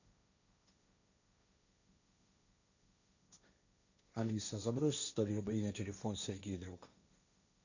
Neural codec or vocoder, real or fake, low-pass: codec, 16 kHz, 1.1 kbps, Voila-Tokenizer; fake; 7.2 kHz